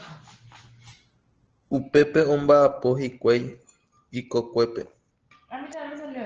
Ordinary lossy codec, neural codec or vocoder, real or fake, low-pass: Opus, 16 kbps; none; real; 7.2 kHz